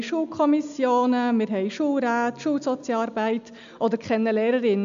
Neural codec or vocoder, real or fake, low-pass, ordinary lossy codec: none; real; 7.2 kHz; MP3, 64 kbps